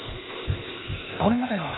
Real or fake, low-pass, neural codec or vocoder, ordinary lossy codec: fake; 7.2 kHz; codec, 16 kHz, 0.8 kbps, ZipCodec; AAC, 16 kbps